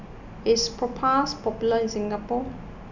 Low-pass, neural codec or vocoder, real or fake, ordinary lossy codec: 7.2 kHz; none; real; none